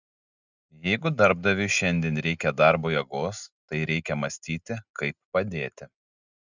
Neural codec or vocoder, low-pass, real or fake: none; 7.2 kHz; real